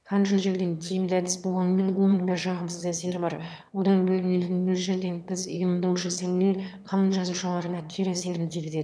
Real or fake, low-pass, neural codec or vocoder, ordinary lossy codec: fake; none; autoencoder, 22.05 kHz, a latent of 192 numbers a frame, VITS, trained on one speaker; none